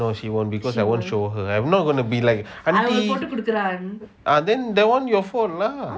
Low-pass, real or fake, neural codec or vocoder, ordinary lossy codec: none; real; none; none